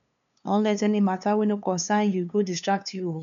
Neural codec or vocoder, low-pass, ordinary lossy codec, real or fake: codec, 16 kHz, 2 kbps, FunCodec, trained on LibriTTS, 25 frames a second; 7.2 kHz; none; fake